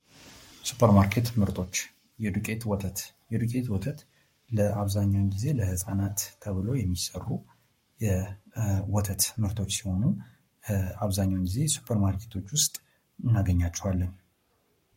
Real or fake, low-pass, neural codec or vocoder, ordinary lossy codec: fake; 19.8 kHz; codec, 44.1 kHz, 7.8 kbps, Pupu-Codec; MP3, 64 kbps